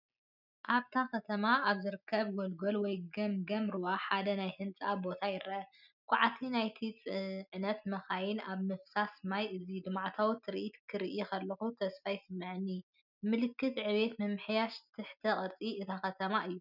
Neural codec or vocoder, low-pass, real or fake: none; 5.4 kHz; real